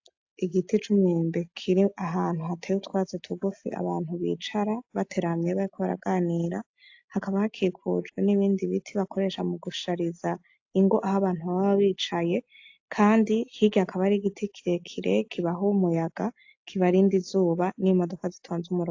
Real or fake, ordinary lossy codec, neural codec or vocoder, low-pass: real; AAC, 48 kbps; none; 7.2 kHz